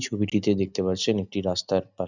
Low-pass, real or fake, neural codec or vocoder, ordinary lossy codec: 7.2 kHz; real; none; none